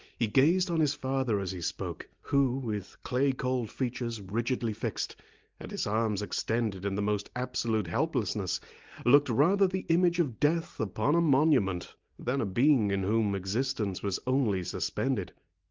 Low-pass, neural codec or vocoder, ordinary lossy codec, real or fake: 7.2 kHz; none; Opus, 32 kbps; real